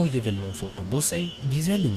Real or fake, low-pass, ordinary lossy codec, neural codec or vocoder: fake; 14.4 kHz; Opus, 64 kbps; codec, 44.1 kHz, 2.6 kbps, DAC